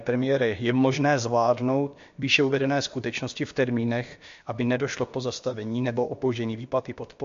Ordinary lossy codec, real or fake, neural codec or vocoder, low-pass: MP3, 48 kbps; fake; codec, 16 kHz, about 1 kbps, DyCAST, with the encoder's durations; 7.2 kHz